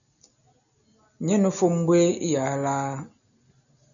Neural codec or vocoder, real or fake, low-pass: none; real; 7.2 kHz